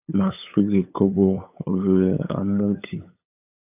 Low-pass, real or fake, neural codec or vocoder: 3.6 kHz; fake; codec, 16 kHz, 8 kbps, FunCodec, trained on LibriTTS, 25 frames a second